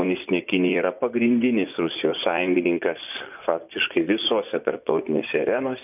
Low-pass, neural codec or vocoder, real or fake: 3.6 kHz; vocoder, 24 kHz, 100 mel bands, Vocos; fake